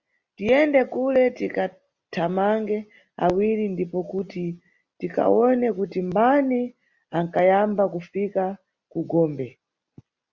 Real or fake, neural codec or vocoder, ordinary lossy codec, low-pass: real; none; Opus, 64 kbps; 7.2 kHz